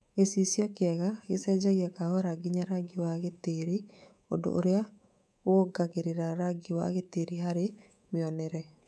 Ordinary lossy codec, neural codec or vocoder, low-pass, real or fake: none; codec, 24 kHz, 3.1 kbps, DualCodec; none; fake